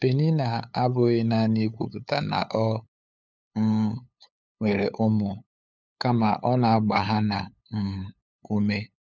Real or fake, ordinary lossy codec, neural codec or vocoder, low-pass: fake; none; codec, 16 kHz, 8 kbps, FunCodec, trained on LibriTTS, 25 frames a second; none